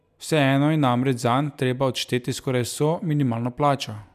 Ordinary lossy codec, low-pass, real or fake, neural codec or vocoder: none; 14.4 kHz; real; none